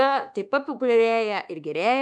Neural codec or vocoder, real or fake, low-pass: codec, 24 kHz, 1.2 kbps, DualCodec; fake; 10.8 kHz